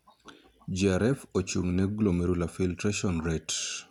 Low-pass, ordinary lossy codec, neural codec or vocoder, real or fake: 19.8 kHz; none; none; real